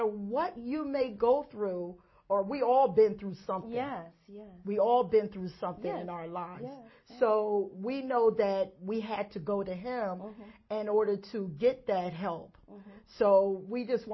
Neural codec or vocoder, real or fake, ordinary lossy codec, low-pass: none; real; MP3, 24 kbps; 7.2 kHz